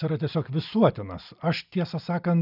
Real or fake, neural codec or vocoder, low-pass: real; none; 5.4 kHz